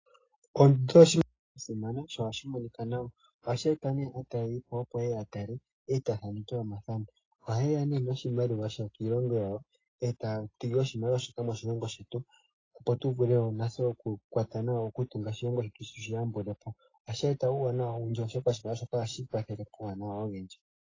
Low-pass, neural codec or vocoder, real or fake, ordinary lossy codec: 7.2 kHz; none; real; AAC, 32 kbps